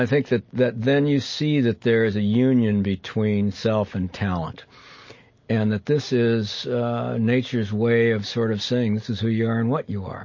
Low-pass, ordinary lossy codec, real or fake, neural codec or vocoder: 7.2 kHz; MP3, 32 kbps; real; none